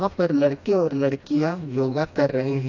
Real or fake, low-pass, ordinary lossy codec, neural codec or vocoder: fake; 7.2 kHz; AAC, 48 kbps; codec, 16 kHz, 1 kbps, FreqCodec, smaller model